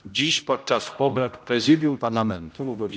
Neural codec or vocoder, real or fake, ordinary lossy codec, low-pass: codec, 16 kHz, 0.5 kbps, X-Codec, HuBERT features, trained on general audio; fake; none; none